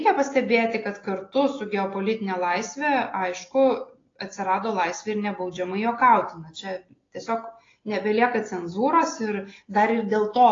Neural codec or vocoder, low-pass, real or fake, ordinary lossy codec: none; 7.2 kHz; real; AAC, 32 kbps